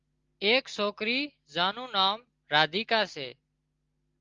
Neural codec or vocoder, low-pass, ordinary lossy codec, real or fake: none; 7.2 kHz; Opus, 32 kbps; real